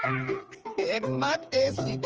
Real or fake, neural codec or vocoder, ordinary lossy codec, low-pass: fake; codec, 16 kHz, 4 kbps, FreqCodec, smaller model; Opus, 24 kbps; 7.2 kHz